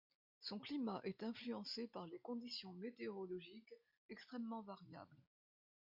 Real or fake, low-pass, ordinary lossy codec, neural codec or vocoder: real; 5.4 kHz; MP3, 48 kbps; none